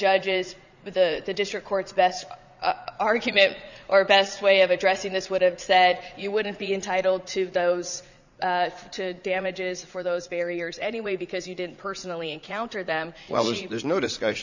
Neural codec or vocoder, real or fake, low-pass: vocoder, 44.1 kHz, 80 mel bands, Vocos; fake; 7.2 kHz